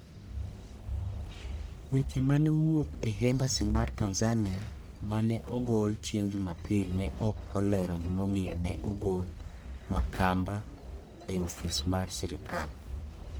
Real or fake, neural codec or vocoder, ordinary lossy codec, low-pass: fake; codec, 44.1 kHz, 1.7 kbps, Pupu-Codec; none; none